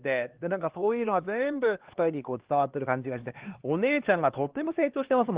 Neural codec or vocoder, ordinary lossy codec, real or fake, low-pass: codec, 16 kHz, 2 kbps, X-Codec, HuBERT features, trained on LibriSpeech; Opus, 16 kbps; fake; 3.6 kHz